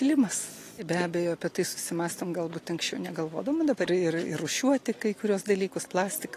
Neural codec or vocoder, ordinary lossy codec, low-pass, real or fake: none; AAC, 48 kbps; 14.4 kHz; real